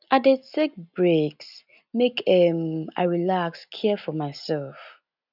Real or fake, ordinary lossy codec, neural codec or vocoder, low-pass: real; none; none; 5.4 kHz